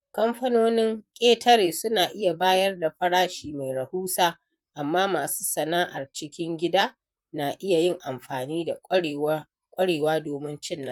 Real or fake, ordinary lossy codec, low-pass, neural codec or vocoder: fake; none; 19.8 kHz; vocoder, 44.1 kHz, 128 mel bands, Pupu-Vocoder